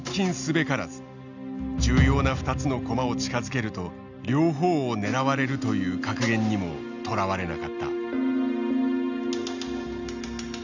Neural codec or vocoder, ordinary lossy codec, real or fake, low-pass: none; none; real; 7.2 kHz